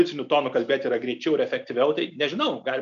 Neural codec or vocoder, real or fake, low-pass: none; real; 7.2 kHz